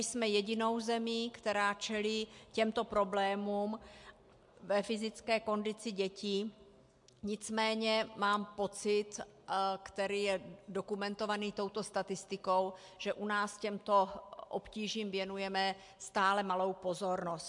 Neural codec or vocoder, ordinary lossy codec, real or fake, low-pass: none; MP3, 64 kbps; real; 10.8 kHz